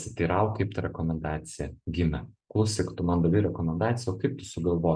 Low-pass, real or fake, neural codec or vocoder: 9.9 kHz; real; none